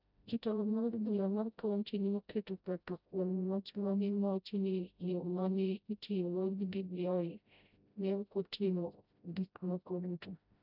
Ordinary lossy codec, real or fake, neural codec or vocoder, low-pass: none; fake; codec, 16 kHz, 0.5 kbps, FreqCodec, smaller model; 5.4 kHz